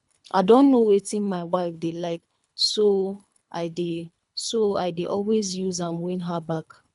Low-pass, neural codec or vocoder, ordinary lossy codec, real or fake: 10.8 kHz; codec, 24 kHz, 3 kbps, HILCodec; none; fake